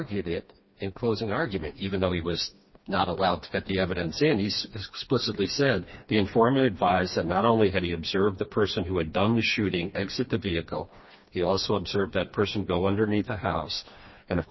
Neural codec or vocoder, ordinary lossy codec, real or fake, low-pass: codec, 16 kHz, 2 kbps, FreqCodec, smaller model; MP3, 24 kbps; fake; 7.2 kHz